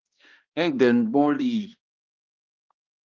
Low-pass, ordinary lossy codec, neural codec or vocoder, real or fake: 7.2 kHz; Opus, 32 kbps; codec, 16 kHz, 1 kbps, X-Codec, HuBERT features, trained on balanced general audio; fake